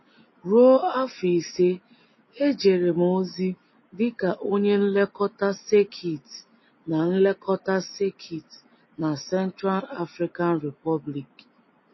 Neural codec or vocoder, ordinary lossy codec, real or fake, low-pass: none; MP3, 24 kbps; real; 7.2 kHz